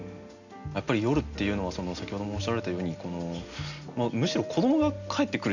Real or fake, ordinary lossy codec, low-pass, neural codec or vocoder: real; none; 7.2 kHz; none